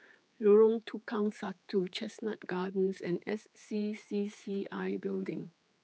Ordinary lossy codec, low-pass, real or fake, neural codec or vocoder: none; none; fake; codec, 16 kHz, 4 kbps, X-Codec, HuBERT features, trained on general audio